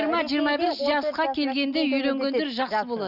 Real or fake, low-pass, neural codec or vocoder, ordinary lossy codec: real; 5.4 kHz; none; none